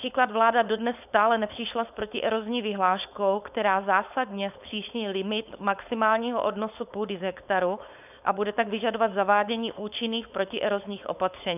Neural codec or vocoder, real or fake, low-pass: codec, 16 kHz, 4.8 kbps, FACodec; fake; 3.6 kHz